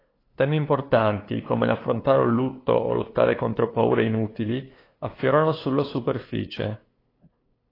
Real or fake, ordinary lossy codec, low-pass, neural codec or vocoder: fake; AAC, 24 kbps; 5.4 kHz; codec, 16 kHz, 2 kbps, FunCodec, trained on LibriTTS, 25 frames a second